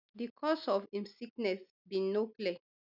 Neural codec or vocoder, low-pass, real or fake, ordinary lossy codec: none; 5.4 kHz; real; none